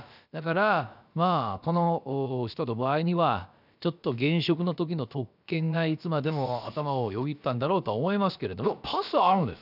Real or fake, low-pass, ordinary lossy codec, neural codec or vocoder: fake; 5.4 kHz; none; codec, 16 kHz, about 1 kbps, DyCAST, with the encoder's durations